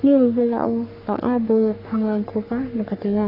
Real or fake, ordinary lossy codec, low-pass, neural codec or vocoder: fake; none; 5.4 kHz; codec, 44.1 kHz, 3.4 kbps, Pupu-Codec